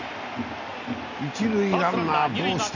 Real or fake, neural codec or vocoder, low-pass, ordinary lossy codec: real; none; 7.2 kHz; none